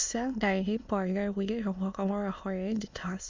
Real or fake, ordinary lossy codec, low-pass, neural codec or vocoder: fake; MP3, 64 kbps; 7.2 kHz; autoencoder, 22.05 kHz, a latent of 192 numbers a frame, VITS, trained on many speakers